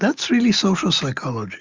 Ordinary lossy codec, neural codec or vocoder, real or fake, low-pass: Opus, 32 kbps; none; real; 7.2 kHz